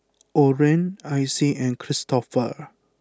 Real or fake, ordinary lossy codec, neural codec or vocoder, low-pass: real; none; none; none